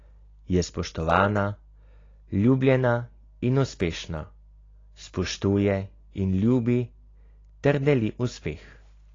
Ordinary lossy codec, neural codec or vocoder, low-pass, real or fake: AAC, 32 kbps; none; 7.2 kHz; real